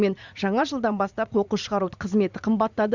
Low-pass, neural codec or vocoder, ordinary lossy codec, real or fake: 7.2 kHz; vocoder, 44.1 kHz, 80 mel bands, Vocos; Opus, 64 kbps; fake